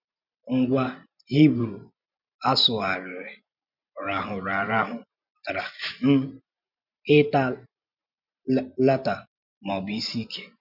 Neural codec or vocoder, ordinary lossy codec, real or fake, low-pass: vocoder, 44.1 kHz, 128 mel bands every 512 samples, BigVGAN v2; none; fake; 5.4 kHz